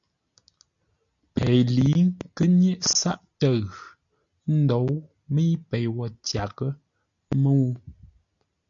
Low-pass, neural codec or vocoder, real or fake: 7.2 kHz; none; real